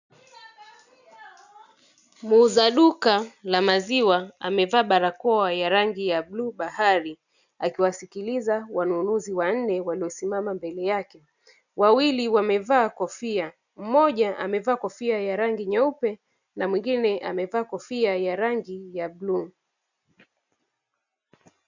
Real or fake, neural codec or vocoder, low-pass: real; none; 7.2 kHz